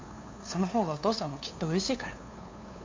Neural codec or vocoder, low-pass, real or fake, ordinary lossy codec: codec, 16 kHz, 2 kbps, FunCodec, trained on LibriTTS, 25 frames a second; 7.2 kHz; fake; MP3, 48 kbps